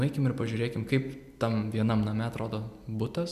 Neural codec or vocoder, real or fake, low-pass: none; real; 14.4 kHz